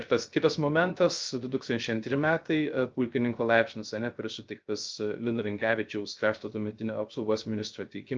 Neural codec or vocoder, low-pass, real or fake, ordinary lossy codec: codec, 16 kHz, 0.3 kbps, FocalCodec; 7.2 kHz; fake; Opus, 24 kbps